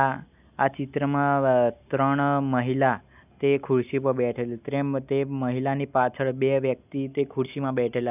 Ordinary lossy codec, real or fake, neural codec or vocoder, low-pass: none; real; none; 3.6 kHz